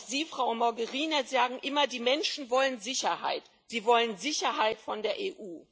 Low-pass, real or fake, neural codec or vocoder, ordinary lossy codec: none; real; none; none